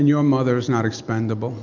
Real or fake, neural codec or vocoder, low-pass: real; none; 7.2 kHz